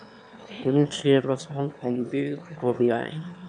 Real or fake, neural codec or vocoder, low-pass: fake; autoencoder, 22.05 kHz, a latent of 192 numbers a frame, VITS, trained on one speaker; 9.9 kHz